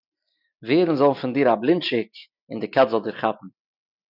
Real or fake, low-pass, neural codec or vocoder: real; 5.4 kHz; none